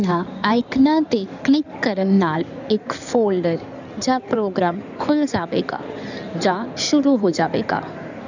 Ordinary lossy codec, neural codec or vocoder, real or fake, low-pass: none; codec, 16 kHz in and 24 kHz out, 2.2 kbps, FireRedTTS-2 codec; fake; 7.2 kHz